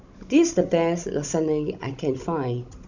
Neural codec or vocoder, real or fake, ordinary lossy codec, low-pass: codec, 16 kHz, 16 kbps, FunCodec, trained on Chinese and English, 50 frames a second; fake; none; 7.2 kHz